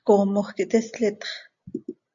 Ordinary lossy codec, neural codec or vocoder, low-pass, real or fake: AAC, 64 kbps; none; 7.2 kHz; real